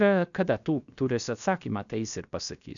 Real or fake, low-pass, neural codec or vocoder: fake; 7.2 kHz; codec, 16 kHz, 0.7 kbps, FocalCodec